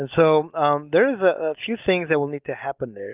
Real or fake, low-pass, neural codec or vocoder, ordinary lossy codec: real; 3.6 kHz; none; Opus, 32 kbps